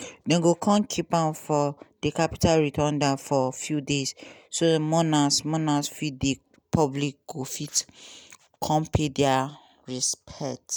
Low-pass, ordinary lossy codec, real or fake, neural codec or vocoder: none; none; real; none